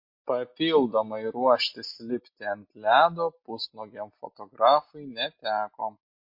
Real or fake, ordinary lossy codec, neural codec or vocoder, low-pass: real; MP3, 32 kbps; none; 5.4 kHz